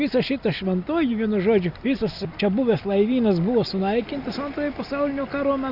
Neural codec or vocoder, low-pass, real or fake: none; 5.4 kHz; real